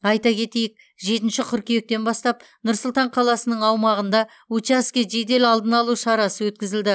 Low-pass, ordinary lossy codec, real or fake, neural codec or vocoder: none; none; real; none